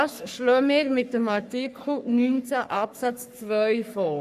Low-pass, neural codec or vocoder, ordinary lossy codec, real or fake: 14.4 kHz; codec, 44.1 kHz, 3.4 kbps, Pupu-Codec; AAC, 96 kbps; fake